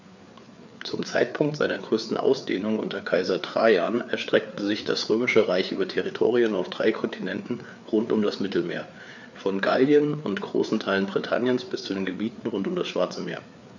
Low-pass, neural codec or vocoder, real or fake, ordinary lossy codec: 7.2 kHz; codec, 16 kHz, 4 kbps, FreqCodec, larger model; fake; none